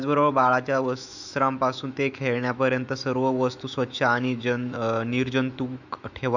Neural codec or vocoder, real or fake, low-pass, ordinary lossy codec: autoencoder, 48 kHz, 128 numbers a frame, DAC-VAE, trained on Japanese speech; fake; 7.2 kHz; none